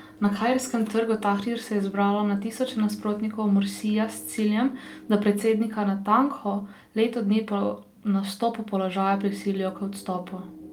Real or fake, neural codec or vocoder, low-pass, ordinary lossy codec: real; none; 19.8 kHz; Opus, 32 kbps